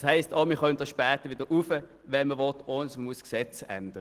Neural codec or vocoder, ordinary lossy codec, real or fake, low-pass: none; Opus, 16 kbps; real; 14.4 kHz